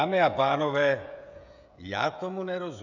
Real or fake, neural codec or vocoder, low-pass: fake; codec, 16 kHz, 16 kbps, FreqCodec, smaller model; 7.2 kHz